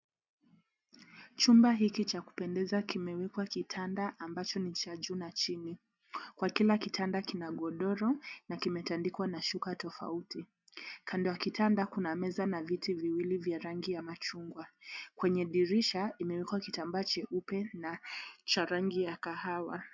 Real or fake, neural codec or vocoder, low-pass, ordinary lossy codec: real; none; 7.2 kHz; MP3, 64 kbps